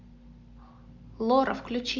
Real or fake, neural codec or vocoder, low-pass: real; none; 7.2 kHz